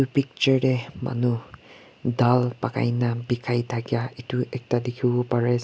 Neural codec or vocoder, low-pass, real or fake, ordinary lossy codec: none; none; real; none